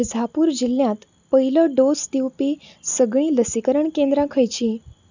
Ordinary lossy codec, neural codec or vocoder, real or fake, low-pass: none; none; real; 7.2 kHz